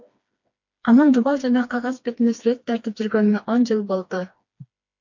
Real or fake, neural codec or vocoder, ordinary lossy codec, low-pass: fake; codec, 16 kHz, 2 kbps, FreqCodec, smaller model; MP3, 48 kbps; 7.2 kHz